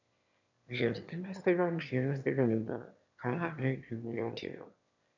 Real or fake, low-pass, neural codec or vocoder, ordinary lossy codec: fake; 7.2 kHz; autoencoder, 22.05 kHz, a latent of 192 numbers a frame, VITS, trained on one speaker; none